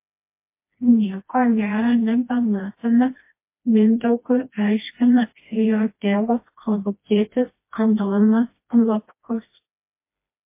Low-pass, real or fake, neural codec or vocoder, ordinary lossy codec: 3.6 kHz; fake; codec, 16 kHz, 1 kbps, FreqCodec, smaller model; MP3, 24 kbps